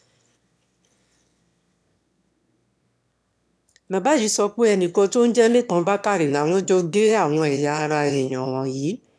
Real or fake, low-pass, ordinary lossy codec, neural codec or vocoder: fake; none; none; autoencoder, 22.05 kHz, a latent of 192 numbers a frame, VITS, trained on one speaker